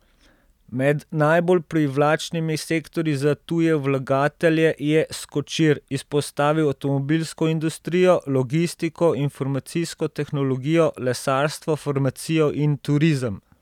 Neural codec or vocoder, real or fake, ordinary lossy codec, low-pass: none; real; none; 19.8 kHz